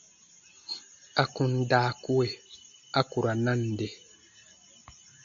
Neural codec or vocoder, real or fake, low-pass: none; real; 7.2 kHz